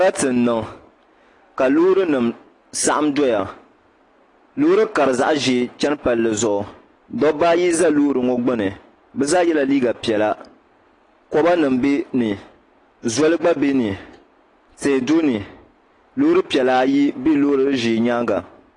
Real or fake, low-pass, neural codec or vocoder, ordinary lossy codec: real; 10.8 kHz; none; AAC, 32 kbps